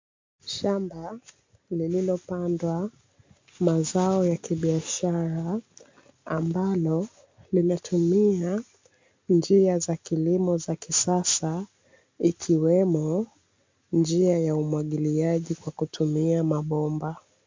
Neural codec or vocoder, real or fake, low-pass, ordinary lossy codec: none; real; 7.2 kHz; MP3, 64 kbps